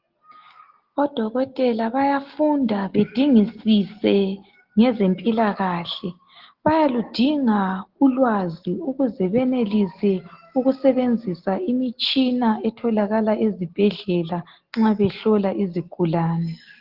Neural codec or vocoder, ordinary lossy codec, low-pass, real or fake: none; Opus, 16 kbps; 5.4 kHz; real